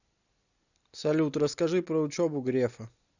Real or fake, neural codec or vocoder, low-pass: real; none; 7.2 kHz